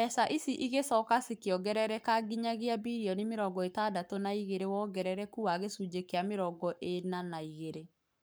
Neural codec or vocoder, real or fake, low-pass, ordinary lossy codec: codec, 44.1 kHz, 7.8 kbps, Pupu-Codec; fake; none; none